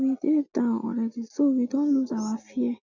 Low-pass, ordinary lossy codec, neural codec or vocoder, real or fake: 7.2 kHz; none; none; real